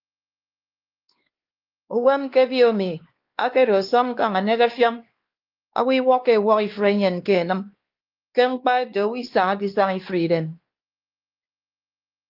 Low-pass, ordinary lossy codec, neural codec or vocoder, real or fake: 5.4 kHz; Opus, 32 kbps; codec, 16 kHz, 2 kbps, X-Codec, WavLM features, trained on Multilingual LibriSpeech; fake